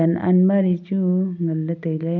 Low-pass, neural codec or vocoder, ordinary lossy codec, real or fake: 7.2 kHz; none; MP3, 64 kbps; real